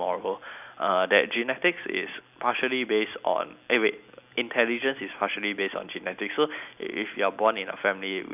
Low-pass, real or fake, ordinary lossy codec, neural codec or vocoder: 3.6 kHz; real; none; none